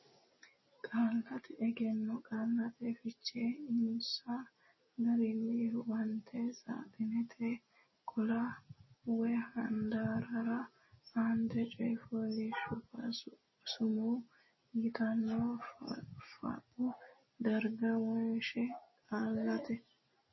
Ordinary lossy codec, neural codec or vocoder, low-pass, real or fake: MP3, 24 kbps; none; 7.2 kHz; real